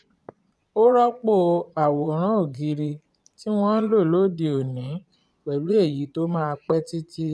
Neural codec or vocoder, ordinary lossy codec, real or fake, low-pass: vocoder, 22.05 kHz, 80 mel bands, Vocos; none; fake; none